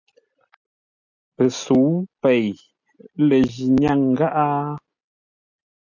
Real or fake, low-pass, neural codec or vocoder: real; 7.2 kHz; none